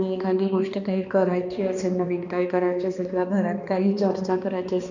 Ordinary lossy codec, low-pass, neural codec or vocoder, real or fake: none; 7.2 kHz; codec, 16 kHz, 2 kbps, X-Codec, HuBERT features, trained on balanced general audio; fake